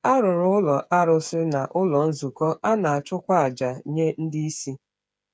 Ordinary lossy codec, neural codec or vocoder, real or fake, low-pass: none; codec, 16 kHz, 8 kbps, FreqCodec, smaller model; fake; none